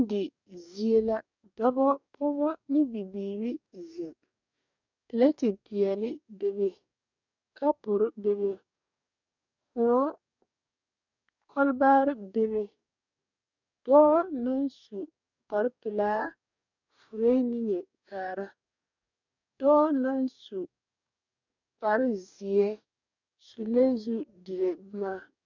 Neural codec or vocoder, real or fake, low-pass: codec, 44.1 kHz, 2.6 kbps, DAC; fake; 7.2 kHz